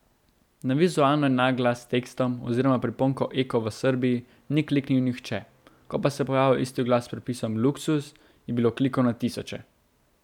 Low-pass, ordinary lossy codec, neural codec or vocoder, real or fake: 19.8 kHz; none; none; real